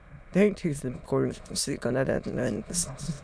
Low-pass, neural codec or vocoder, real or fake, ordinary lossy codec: none; autoencoder, 22.05 kHz, a latent of 192 numbers a frame, VITS, trained on many speakers; fake; none